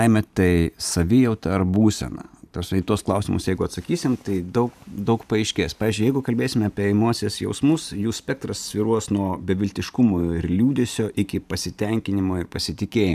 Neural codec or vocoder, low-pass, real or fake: vocoder, 44.1 kHz, 128 mel bands every 512 samples, BigVGAN v2; 14.4 kHz; fake